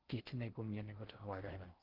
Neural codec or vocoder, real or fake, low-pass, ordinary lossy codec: codec, 16 kHz in and 24 kHz out, 0.6 kbps, FocalCodec, streaming, 4096 codes; fake; 5.4 kHz; Opus, 16 kbps